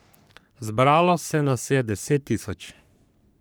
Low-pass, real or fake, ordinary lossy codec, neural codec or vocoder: none; fake; none; codec, 44.1 kHz, 3.4 kbps, Pupu-Codec